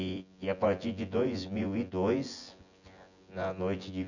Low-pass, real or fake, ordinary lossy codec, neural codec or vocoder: 7.2 kHz; fake; none; vocoder, 24 kHz, 100 mel bands, Vocos